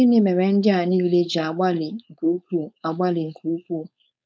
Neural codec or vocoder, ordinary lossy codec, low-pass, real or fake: codec, 16 kHz, 4.8 kbps, FACodec; none; none; fake